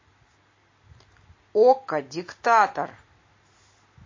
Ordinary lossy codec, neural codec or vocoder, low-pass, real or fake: MP3, 32 kbps; none; 7.2 kHz; real